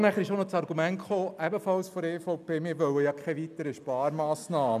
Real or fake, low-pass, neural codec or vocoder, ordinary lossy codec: real; 14.4 kHz; none; none